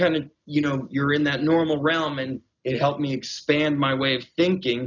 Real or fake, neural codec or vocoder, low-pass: real; none; 7.2 kHz